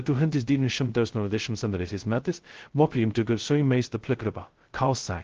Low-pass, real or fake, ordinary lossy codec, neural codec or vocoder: 7.2 kHz; fake; Opus, 16 kbps; codec, 16 kHz, 0.2 kbps, FocalCodec